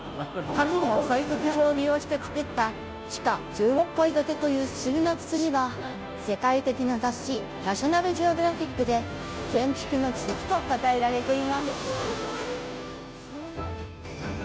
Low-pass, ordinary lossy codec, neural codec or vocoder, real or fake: none; none; codec, 16 kHz, 0.5 kbps, FunCodec, trained on Chinese and English, 25 frames a second; fake